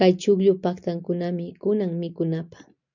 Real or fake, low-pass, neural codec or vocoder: real; 7.2 kHz; none